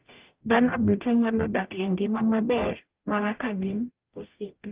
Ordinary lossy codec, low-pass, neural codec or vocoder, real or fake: Opus, 24 kbps; 3.6 kHz; codec, 44.1 kHz, 0.9 kbps, DAC; fake